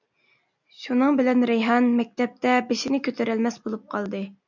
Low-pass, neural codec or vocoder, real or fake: 7.2 kHz; none; real